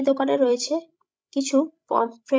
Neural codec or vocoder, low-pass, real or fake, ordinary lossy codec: codec, 16 kHz, 16 kbps, FreqCodec, larger model; none; fake; none